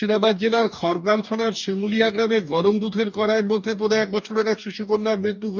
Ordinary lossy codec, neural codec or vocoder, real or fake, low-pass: none; codec, 44.1 kHz, 2.6 kbps, DAC; fake; 7.2 kHz